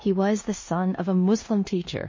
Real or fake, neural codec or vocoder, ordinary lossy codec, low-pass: fake; codec, 16 kHz in and 24 kHz out, 0.9 kbps, LongCat-Audio-Codec, four codebook decoder; MP3, 32 kbps; 7.2 kHz